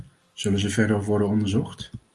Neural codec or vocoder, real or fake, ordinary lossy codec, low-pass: none; real; Opus, 32 kbps; 10.8 kHz